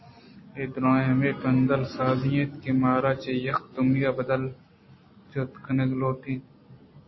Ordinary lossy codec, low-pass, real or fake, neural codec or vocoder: MP3, 24 kbps; 7.2 kHz; real; none